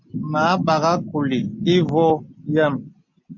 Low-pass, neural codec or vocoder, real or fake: 7.2 kHz; none; real